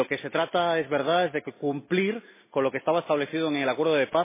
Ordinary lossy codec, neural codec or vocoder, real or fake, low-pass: MP3, 16 kbps; none; real; 3.6 kHz